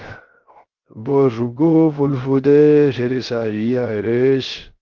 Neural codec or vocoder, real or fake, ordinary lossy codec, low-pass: codec, 16 kHz, 0.3 kbps, FocalCodec; fake; Opus, 16 kbps; 7.2 kHz